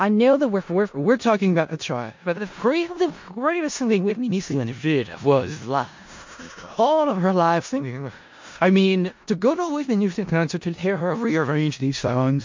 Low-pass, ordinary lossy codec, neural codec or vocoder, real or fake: 7.2 kHz; MP3, 48 kbps; codec, 16 kHz in and 24 kHz out, 0.4 kbps, LongCat-Audio-Codec, four codebook decoder; fake